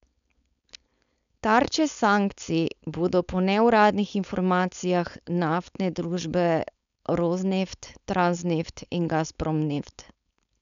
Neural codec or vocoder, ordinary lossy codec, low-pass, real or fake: codec, 16 kHz, 4.8 kbps, FACodec; none; 7.2 kHz; fake